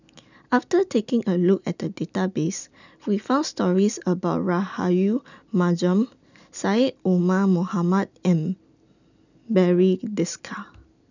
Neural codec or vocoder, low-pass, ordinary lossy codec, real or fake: none; 7.2 kHz; none; real